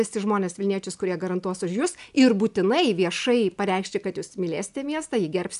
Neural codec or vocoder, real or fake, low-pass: none; real; 10.8 kHz